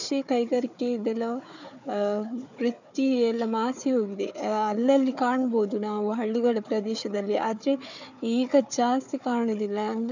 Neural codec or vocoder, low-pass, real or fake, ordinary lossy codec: codec, 16 kHz, 4 kbps, FunCodec, trained on Chinese and English, 50 frames a second; 7.2 kHz; fake; none